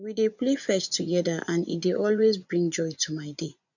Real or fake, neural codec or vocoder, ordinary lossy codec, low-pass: fake; vocoder, 24 kHz, 100 mel bands, Vocos; none; 7.2 kHz